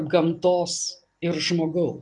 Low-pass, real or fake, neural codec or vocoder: 10.8 kHz; real; none